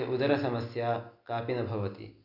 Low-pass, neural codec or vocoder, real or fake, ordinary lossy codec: 5.4 kHz; none; real; none